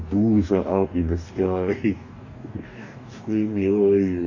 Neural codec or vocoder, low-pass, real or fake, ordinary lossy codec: codec, 44.1 kHz, 2.6 kbps, DAC; 7.2 kHz; fake; none